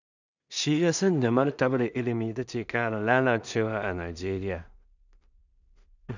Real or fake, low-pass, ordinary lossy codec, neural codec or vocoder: fake; 7.2 kHz; none; codec, 16 kHz in and 24 kHz out, 0.4 kbps, LongCat-Audio-Codec, two codebook decoder